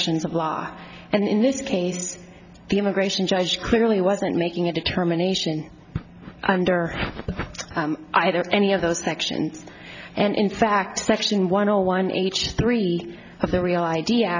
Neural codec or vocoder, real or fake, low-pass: none; real; 7.2 kHz